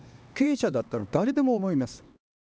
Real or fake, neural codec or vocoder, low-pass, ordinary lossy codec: fake; codec, 16 kHz, 2 kbps, X-Codec, HuBERT features, trained on LibriSpeech; none; none